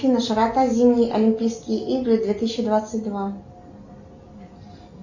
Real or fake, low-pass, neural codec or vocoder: real; 7.2 kHz; none